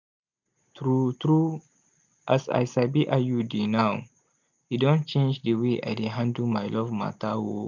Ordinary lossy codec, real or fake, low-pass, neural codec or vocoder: none; real; 7.2 kHz; none